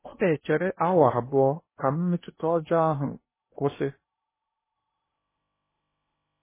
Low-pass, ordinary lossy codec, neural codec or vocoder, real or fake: 3.6 kHz; MP3, 16 kbps; codec, 16 kHz in and 24 kHz out, 0.8 kbps, FocalCodec, streaming, 65536 codes; fake